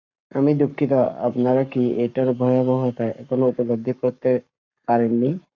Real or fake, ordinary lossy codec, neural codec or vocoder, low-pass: fake; MP3, 64 kbps; codec, 44.1 kHz, 7.8 kbps, Pupu-Codec; 7.2 kHz